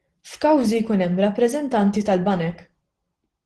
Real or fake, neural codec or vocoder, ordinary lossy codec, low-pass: real; none; Opus, 16 kbps; 10.8 kHz